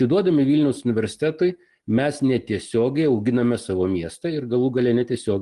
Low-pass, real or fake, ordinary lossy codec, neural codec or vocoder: 10.8 kHz; real; Opus, 24 kbps; none